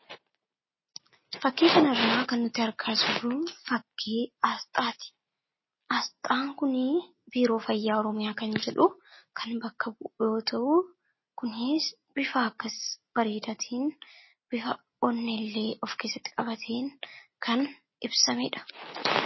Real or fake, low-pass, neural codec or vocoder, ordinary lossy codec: real; 7.2 kHz; none; MP3, 24 kbps